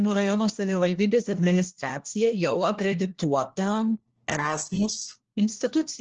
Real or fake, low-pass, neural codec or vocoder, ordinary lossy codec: fake; 7.2 kHz; codec, 16 kHz, 1 kbps, FunCodec, trained on LibriTTS, 50 frames a second; Opus, 16 kbps